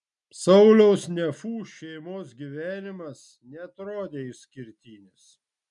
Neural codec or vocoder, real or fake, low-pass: none; real; 10.8 kHz